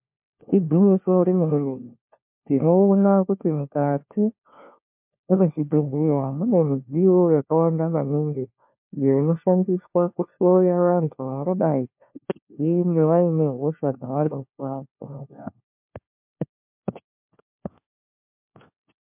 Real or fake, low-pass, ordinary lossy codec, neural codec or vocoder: fake; 3.6 kHz; MP3, 32 kbps; codec, 16 kHz, 1 kbps, FunCodec, trained on LibriTTS, 50 frames a second